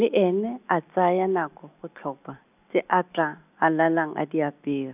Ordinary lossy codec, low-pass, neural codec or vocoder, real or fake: none; 3.6 kHz; none; real